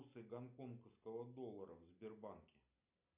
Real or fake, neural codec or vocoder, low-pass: real; none; 3.6 kHz